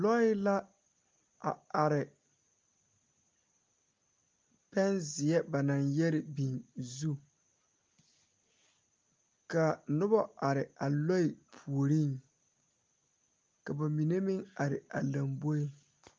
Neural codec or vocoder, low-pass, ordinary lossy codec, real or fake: none; 7.2 kHz; Opus, 24 kbps; real